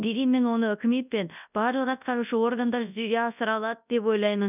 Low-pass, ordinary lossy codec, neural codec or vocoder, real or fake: 3.6 kHz; none; codec, 24 kHz, 0.9 kbps, WavTokenizer, large speech release; fake